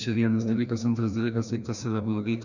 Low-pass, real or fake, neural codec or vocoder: 7.2 kHz; fake; codec, 16 kHz, 1 kbps, FreqCodec, larger model